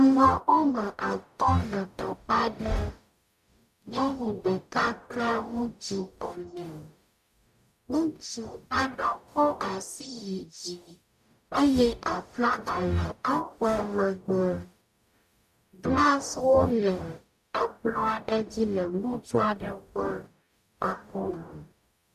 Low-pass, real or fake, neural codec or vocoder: 14.4 kHz; fake; codec, 44.1 kHz, 0.9 kbps, DAC